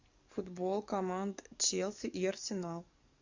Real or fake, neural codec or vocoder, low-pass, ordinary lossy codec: fake; codec, 44.1 kHz, 7.8 kbps, DAC; 7.2 kHz; Opus, 64 kbps